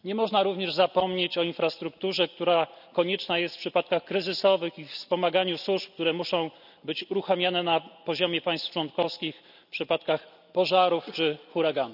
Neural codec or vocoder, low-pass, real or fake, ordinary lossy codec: none; 5.4 kHz; real; none